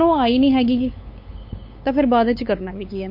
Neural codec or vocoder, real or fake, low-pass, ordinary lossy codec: codec, 16 kHz, 8 kbps, FunCodec, trained on LibriTTS, 25 frames a second; fake; 5.4 kHz; MP3, 32 kbps